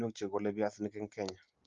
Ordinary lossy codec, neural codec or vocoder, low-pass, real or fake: Opus, 32 kbps; none; 7.2 kHz; real